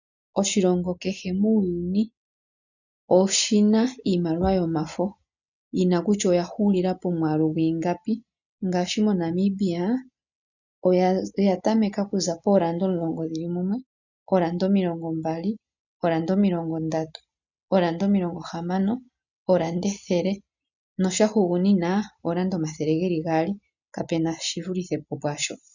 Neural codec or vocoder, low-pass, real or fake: none; 7.2 kHz; real